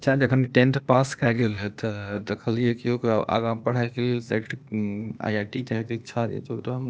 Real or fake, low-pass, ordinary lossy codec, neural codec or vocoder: fake; none; none; codec, 16 kHz, 0.8 kbps, ZipCodec